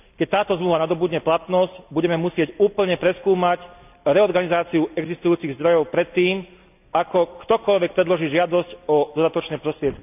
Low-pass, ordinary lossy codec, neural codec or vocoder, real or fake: 3.6 kHz; none; none; real